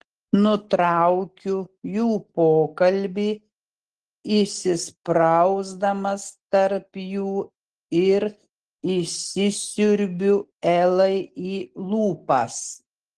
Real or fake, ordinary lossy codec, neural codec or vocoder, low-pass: real; Opus, 16 kbps; none; 10.8 kHz